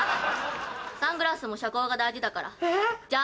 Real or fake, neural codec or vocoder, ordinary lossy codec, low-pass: real; none; none; none